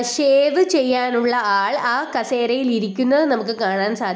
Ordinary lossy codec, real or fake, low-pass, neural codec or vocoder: none; real; none; none